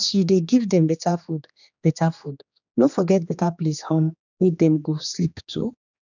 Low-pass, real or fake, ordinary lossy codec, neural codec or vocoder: 7.2 kHz; fake; none; codec, 16 kHz, 2 kbps, X-Codec, HuBERT features, trained on general audio